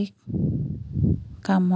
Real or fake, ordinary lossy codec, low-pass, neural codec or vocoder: real; none; none; none